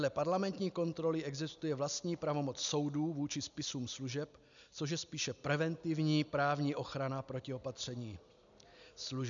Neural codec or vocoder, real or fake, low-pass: none; real; 7.2 kHz